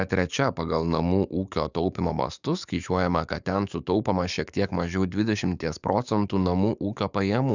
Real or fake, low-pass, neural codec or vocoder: fake; 7.2 kHz; codec, 44.1 kHz, 7.8 kbps, DAC